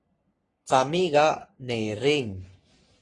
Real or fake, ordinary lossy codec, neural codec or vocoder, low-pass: fake; AAC, 32 kbps; codec, 44.1 kHz, 3.4 kbps, Pupu-Codec; 10.8 kHz